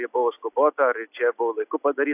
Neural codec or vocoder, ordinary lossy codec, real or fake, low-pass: none; AAC, 32 kbps; real; 3.6 kHz